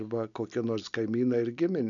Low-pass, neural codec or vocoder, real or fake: 7.2 kHz; none; real